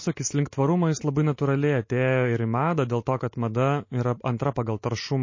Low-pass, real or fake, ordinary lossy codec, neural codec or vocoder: 7.2 kHz; real; MP3, 32 kbps; none